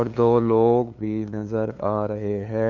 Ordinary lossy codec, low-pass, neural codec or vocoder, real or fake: none; 7.2 kHz; codec, 16 kHz, 2 kbps, FunCodec, trained on LibriTTS, 25 frames a second; fake